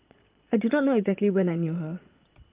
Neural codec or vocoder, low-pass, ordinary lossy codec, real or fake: vocoder, 22.05 kHz, 80 mel bands, Vocos; 3.6 kHz; Opus, 24 kbps; fake